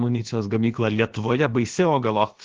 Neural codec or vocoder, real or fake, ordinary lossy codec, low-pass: codec, 16 kHz, about 1 kbps, DyCAST, with the encoder's durations; fake; Opus, 16 kbps; 7.2 kHz